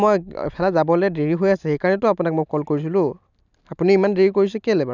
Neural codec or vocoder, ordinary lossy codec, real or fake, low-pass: none; none; real; 7.2 kHz